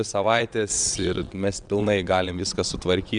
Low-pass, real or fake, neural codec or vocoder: 9.9 kHz; fake; vocoder, 22.05 kHz, 80 mel bands, WaveNeXt